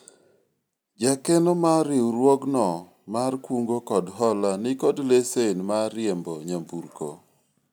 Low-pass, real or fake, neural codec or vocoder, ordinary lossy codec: none; real; none; none